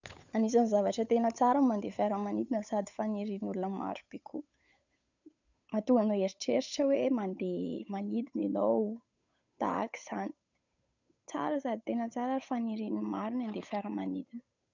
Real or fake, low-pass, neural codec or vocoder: fake; 7.2 kHz; codec, 16 kHz, 8 kbps, FunCodec, trained on Chinese and English, 25 frames a second